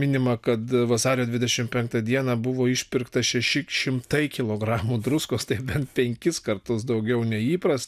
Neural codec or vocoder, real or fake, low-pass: none; real; 14.4 kHz